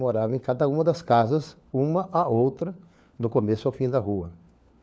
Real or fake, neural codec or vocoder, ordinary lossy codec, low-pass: fake; codec, 16 kHz, 4 kbps, FunCodec, trained on LibriTTS, 50 frames a second; none; none